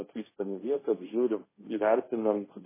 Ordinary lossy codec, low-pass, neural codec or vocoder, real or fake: MP3, 16 kbps; 3.6 kHz; codec, 16 kHz, 1.1 kbps, Voila-Tokenizer; fake